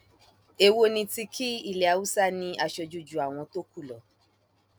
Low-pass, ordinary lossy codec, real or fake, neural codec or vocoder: none; none; real; none